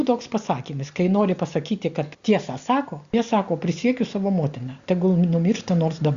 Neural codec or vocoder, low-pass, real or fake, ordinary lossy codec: none; 7.2 kHz; real; Opus, 64 kbps